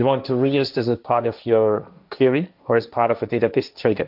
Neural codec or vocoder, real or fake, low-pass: codec, 16 kHz, 1.1 kbps, Voila-Tokenizer; fake; 5.4 kHz